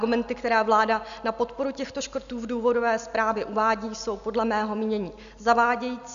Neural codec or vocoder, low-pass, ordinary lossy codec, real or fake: none; 7.2 kHz; MP3, 96 kbps; real